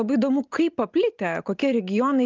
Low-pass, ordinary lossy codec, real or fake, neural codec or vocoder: 7.2 kHz; Opus, 32 kbps; real; none